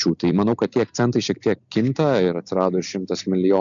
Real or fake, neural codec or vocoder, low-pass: real; none; 7.2 kHz